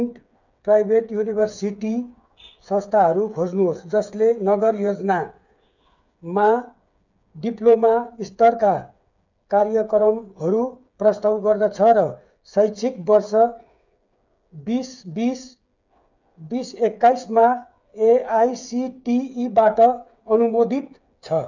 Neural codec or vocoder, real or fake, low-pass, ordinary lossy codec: codec, 16 kHz, 8 kbps, FreqCodec, smaller model; fake; 7.2 kHz; none